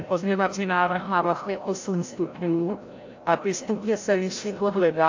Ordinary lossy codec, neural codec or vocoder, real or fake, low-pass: AAC, 48 kbps; codec, 16 kHz, 0.5 kbps, FreqCodec, larger model; fake; 7.2 kHz